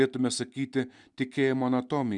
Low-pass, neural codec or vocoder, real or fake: 10.8 kHz; none; real